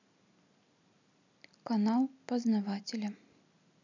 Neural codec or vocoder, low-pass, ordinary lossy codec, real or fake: none; 7.2 kHz; none; real